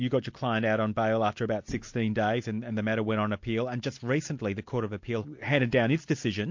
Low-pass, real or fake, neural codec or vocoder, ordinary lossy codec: 7.2 kHz; real; none; MP3, 48 kbps